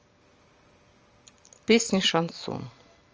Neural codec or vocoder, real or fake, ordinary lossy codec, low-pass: none; real; Opus, 24 kbps; 7.2 kHz